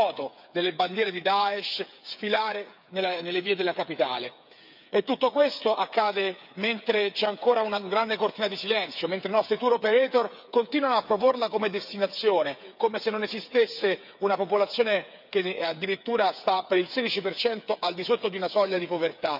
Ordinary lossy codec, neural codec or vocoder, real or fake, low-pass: none; codec, 16 kHz, 8 kbps, FreqCodec, smaller model; fake; 5.4 kHz